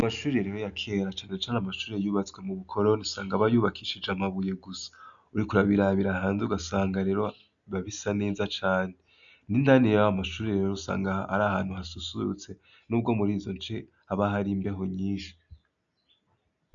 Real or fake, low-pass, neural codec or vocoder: real; 7.2 kHz; none